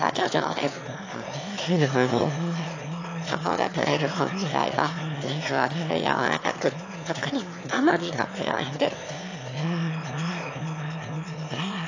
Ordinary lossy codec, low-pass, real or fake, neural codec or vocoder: AAC, 48 kbps; 7.2 kHz; fake; autoencoder, 22.05 kHz, a latent of 192 numbers a frame, VITS, trained on one speaker